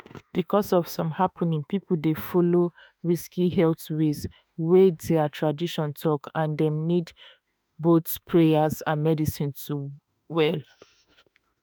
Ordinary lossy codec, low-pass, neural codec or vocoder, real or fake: none; none; autoencoder, 48 kHz, 32 numbers a frame, DAC-VAE, trained on Japanese speech; fake